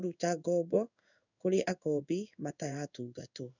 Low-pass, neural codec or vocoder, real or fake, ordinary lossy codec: 7.2 kHz; codec, 16 kHz in and 24 kHz out, 1 kbps, XY-Tokenizer; fake; none